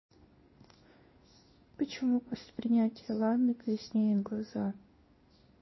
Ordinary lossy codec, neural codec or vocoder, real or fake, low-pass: MP3, 24 kbps; codec, 16 kHz in and 24 kHz out, 1 kbps, XY-Tokenizer; fake; 7.2 kHz